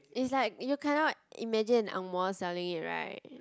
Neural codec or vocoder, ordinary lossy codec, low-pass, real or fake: none; none; none; real